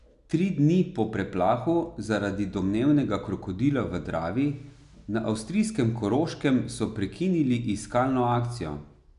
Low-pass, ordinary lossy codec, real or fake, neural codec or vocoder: 10.8 kHz; none; real; none